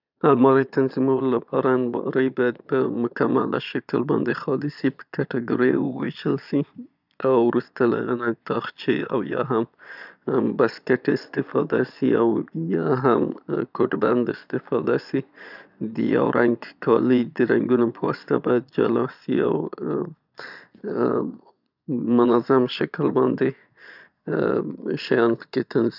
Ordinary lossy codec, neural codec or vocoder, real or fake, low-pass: none; vocoder, 22.05 kHz, 80 mel bands, Vocos; fake; 5.4 kHz